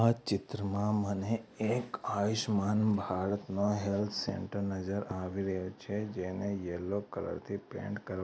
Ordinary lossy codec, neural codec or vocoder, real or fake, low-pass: none; none; real; none